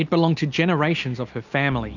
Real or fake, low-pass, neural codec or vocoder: real; 7.2 kHz; none